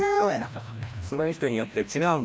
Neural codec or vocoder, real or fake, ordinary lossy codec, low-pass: codec, 16 kHz, 1 kbps, FreqCodec, larger model; fake; none; none